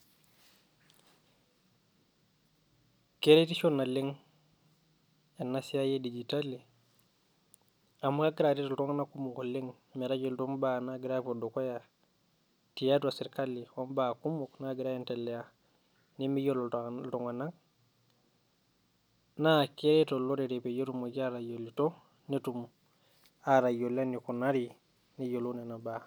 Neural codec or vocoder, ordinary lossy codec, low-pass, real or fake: none; none; none; real